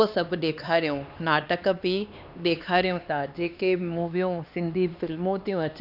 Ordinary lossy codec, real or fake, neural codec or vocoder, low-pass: none; fake; codec, 16 kHz, 2 kbps, X-Codec, HuBERT features, trained on LibriSpeech; 5.4 kHz